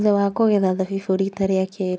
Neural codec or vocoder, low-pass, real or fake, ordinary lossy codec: none; none; real; none